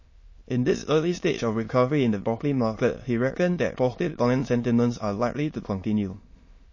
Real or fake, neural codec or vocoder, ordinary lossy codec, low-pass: fake; autoencoder, 22.05 kHz, a latent of 192 numbers a frame, VITS, trained on many speakers; MP3, 32 kbps; 7.2 kHz